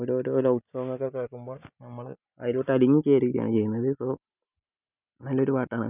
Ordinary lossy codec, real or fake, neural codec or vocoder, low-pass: none; real; none; 3.6 kHz